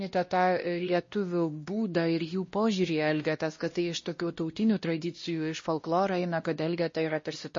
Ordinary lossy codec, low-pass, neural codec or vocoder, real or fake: MP3, 32 kbps; 7.2 kHz; codec, 16 kHz, 1 kbps, X-Codec, WavLM features, trained on Multilingual LibriSpeech; fake